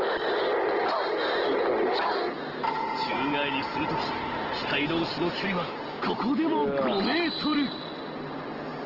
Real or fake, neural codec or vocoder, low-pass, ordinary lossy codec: real; none; 5.4 kHz; Opus, 16 kbps